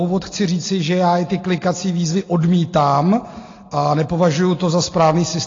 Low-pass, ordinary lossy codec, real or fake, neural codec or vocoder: 7.2 kHz; AAC, 32 kbps; real; none